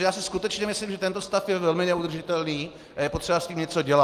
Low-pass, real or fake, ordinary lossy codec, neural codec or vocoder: 14.4 kHz; real; Opus, 32 kbps; none